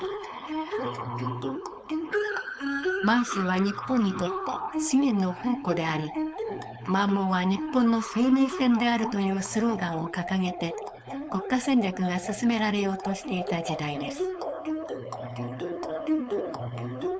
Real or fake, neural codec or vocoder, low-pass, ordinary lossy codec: fake; codec, 16 kHz, 4.8 kbps, FACodec; none; none